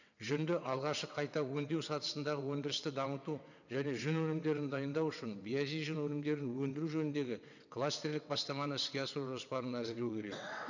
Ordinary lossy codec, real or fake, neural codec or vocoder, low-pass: none; fake; vocoder, 22.05 kHz, 80 mel bands, WaveNeXt; 7.2 kHz